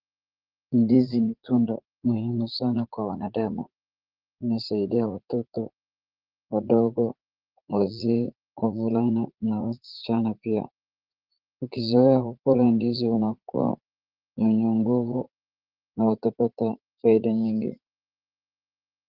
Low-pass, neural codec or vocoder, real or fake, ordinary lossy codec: 5.4 kHz; vocoder, 44.1 kHz, 80 mel bands, Vocos; fake; Opus, 16 kbps